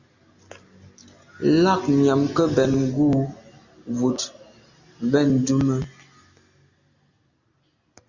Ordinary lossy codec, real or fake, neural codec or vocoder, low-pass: Opus, 64 kbps; real; none; 7.2 kHz